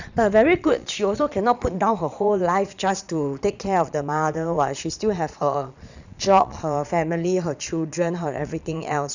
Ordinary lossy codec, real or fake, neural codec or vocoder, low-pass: none; fake; vocoder, 22.05 kHz, 80 mel bands, Vocos; 7.2 kHz